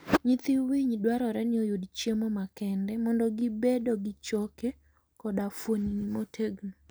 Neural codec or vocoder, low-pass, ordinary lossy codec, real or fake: none; none; none; real